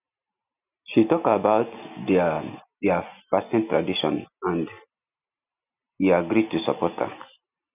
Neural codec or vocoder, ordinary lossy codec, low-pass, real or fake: none; none; 3.6 kHz; real